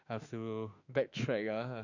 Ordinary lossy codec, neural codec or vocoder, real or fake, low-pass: none; autoencoder, 48 kHz, 32 numbers a frame, DAC-VAE, trained on Japanese speech; fake; 7.2 kHz